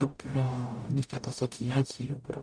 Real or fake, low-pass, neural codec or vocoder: fake; 9.9 kHz; codec, 44.1 kHz, 0.9 kbps, DAC